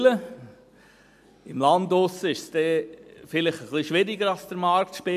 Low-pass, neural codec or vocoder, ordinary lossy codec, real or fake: 14.4 kHz; none; MP3, 96 kbps; real